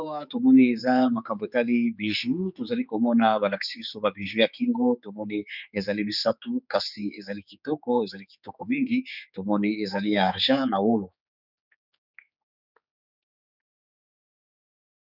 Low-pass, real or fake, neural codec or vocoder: 5.4 kHz; fake; codec, 16 kHz, 4 kbps, X-Codec, HuBERT features, trained on general audio